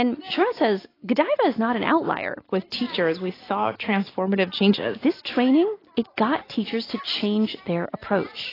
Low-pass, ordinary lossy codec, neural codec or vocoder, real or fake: 5.4 kHz; AAC, 24 kbps; none; real